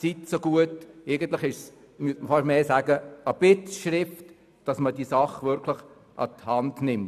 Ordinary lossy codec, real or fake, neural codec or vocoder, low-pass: none; real; none; 14.4 kHz